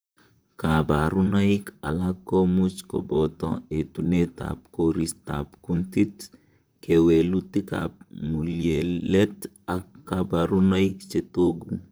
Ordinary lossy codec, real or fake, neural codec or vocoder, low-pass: none; fake; vocoder, 44.1 kHz, 128 mel bands, Pupu-Vocoder; none